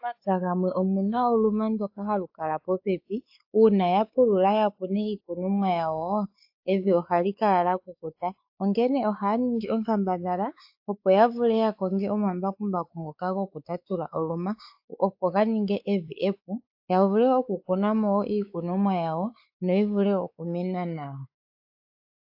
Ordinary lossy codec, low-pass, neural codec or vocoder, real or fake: AAC, 48 kbps; 5.4 kHz; codec, 16 kHz, 4 kbps, X-Codec, WavLM features, trained on Multilingual LibriSpeech; fake